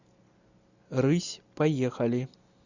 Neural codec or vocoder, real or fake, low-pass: none; real; 7.2 kHz